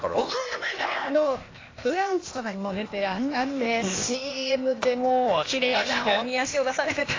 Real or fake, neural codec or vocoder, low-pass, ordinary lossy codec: fake; codec, 16 kHz, 0.8 kbps, ZipCodec; 7.2 kHz; AAC, 32 kbps